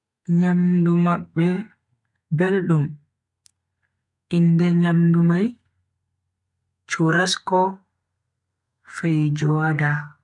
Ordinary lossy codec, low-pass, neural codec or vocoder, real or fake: none; 10.8 kHz; codec, 32 kHz, 1.9 kbps, SNAC; fake